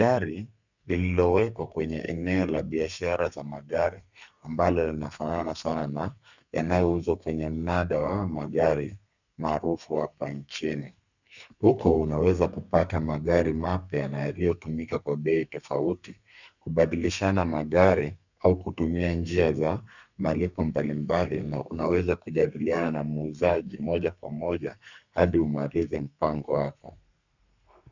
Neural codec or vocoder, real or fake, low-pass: codec, 32 kHz, 1.9 kbps, SNAC; fake; 7.2 kHz